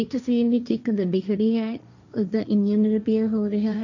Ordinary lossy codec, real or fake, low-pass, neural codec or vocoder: none; fake; 7.2 kHz; codec, 16 kHz, 1.1 kbps, Voila-Tokenizer